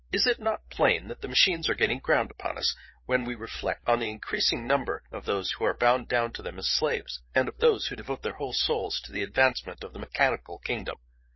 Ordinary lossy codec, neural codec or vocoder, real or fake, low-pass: MP3, 24 kbps; codec, 16 kHz, 8 kbps, FreqCodec, larger model; fake; 7.2 kHz